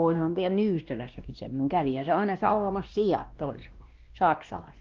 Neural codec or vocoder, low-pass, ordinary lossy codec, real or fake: codec, 16 kHz, 1 kbps, X-Codec, WavLM features, trained on Multilingual LibriSpeech; 7.2 kHz; Opus, 32 kbps; fake